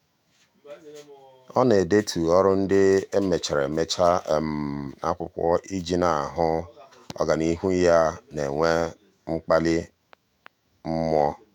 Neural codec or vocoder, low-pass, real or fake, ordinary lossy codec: autoencoder, 48 kHz, 128 numbers a frame, DAC-VAE, trained on Japanese speech; 19.8 kHz; fake; none